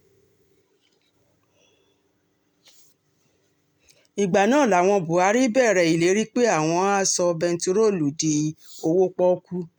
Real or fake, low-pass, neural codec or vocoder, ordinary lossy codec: real; 19.8 kHz; none; MP3, 96 kbps